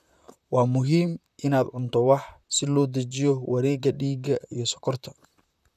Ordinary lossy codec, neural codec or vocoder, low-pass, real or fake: none; vocoder, 44.1 kHz, 128 mel bands, Pupu-Vocoder; 14.4 kHz; fake